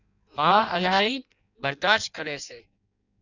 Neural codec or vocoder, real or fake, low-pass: codec, 16 kHz in and 24 kHz out, 0.6 kbps, FireRedTTS-2 codec; fake; 7.2 kHz